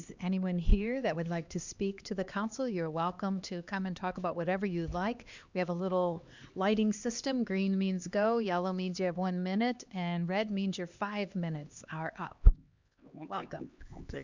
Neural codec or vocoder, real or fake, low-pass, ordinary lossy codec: codec, 16 kHz, 2 kbps, X-Codec, HuBERT features, trained on LibriSpeech; fake; 7.2 kHz; Opus, 64 kbps